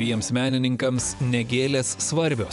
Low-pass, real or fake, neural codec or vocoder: 10.8 kHz; fake; vocoder, 24 kHz, 100 mel bands, Vocos